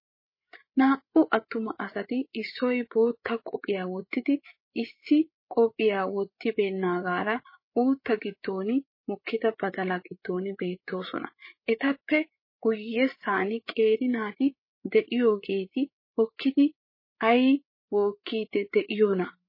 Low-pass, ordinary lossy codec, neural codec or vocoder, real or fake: 5.4 kHz; MP3, 24 kbps; codec, 16 kHz, 8 kbps, FreqCodec, larger model; fake